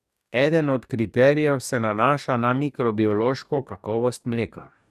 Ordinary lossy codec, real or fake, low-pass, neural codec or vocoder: none; fake; 14.4 kHz; codec, 44.1 kHz, 2.6 kbps, DAC